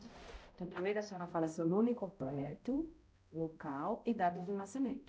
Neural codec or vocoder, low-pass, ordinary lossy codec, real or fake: codec, 16 kHz, 0.5 kbps, X-Codec, HuBERT features, trained on balanced general audio; none; none; fake